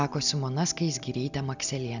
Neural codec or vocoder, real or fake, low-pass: none; real; 7.2 kHz